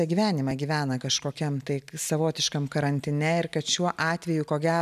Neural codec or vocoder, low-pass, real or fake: none; 14.4 kHz; real